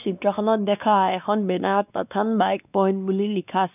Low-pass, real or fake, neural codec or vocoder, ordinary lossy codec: 3.6 kHz; fake; codec, 16 kHz, 2 kbps, X-Codec, WavLM features, trained on Multilingual LibriSpeech; none